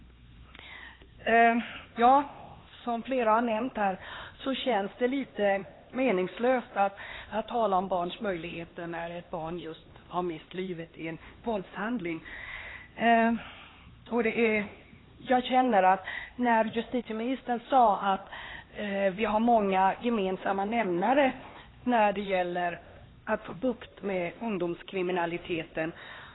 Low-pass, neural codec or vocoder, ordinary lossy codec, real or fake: 7.2 kHz; codec, 16 kHz, 2 kbps, X-Codec, HuBERT features, trained on LibriSpeech; AAC, 16 kbps; fake